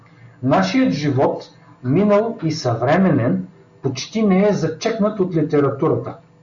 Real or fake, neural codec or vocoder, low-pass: real; none; 7.2 kHz